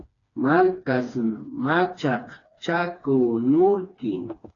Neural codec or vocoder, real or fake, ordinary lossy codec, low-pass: codec, 16 kHz, 2 kbps, FreqCodec, smaller model; fake; AAC, 32 kbps; 7.2 kHz